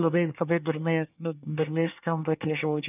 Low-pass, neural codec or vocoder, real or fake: 3.6 kHz; codec, 24 kHz, 1 kbps, SNAC; fake